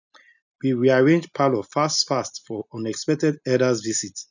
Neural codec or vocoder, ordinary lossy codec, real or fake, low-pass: none; none; real; 7.2 kHz